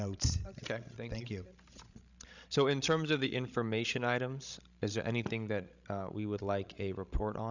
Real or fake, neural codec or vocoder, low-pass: fake; codec, 16 kHz, 16 kbps, FreqCodec, larger model; 7.2 kHz